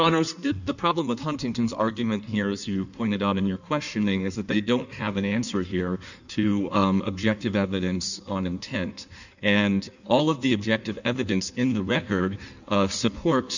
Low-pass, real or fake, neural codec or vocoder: 7.2 kHz; fake; codec, 16 kHz in and 24 kHz out, 1.1 kbps, FireRedTTS-2 codec